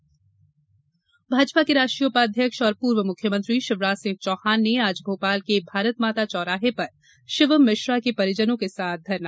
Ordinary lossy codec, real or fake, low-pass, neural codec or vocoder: none; real; none; none